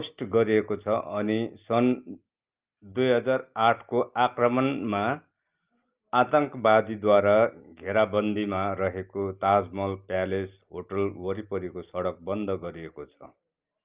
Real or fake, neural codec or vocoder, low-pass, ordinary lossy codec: real; none; 3.6 kHz; Opus, 24 kbps